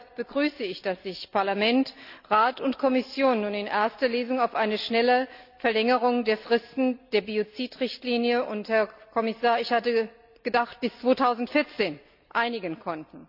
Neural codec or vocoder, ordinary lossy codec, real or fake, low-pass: none; none; real; 5.4 kHz